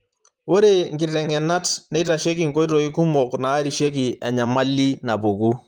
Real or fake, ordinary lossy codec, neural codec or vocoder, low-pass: fake; Opus, 32 kbps; vocoder, 44.1 kHz, 128 mel bands, Pupu-Vocoder; 19.8 kHz